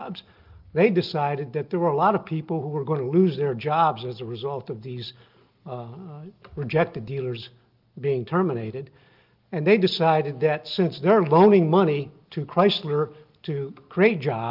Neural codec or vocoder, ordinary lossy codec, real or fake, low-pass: none; Opus, 24 kbps; real; 5.4 kHz